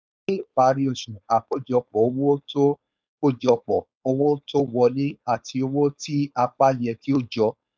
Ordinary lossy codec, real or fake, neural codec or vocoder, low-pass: none; fake; codec, 16 kHz, 4.8 kbps, FACodec; none